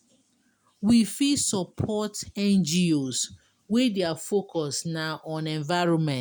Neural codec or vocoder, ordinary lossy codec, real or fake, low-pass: none; none; real; none